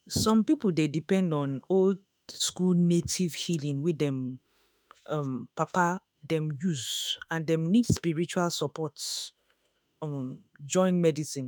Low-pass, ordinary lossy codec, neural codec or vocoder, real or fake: none; none; autoencoder, 48 kHz, 32 numbers a frame, DAC-VAE, trained on Japanese speech; fake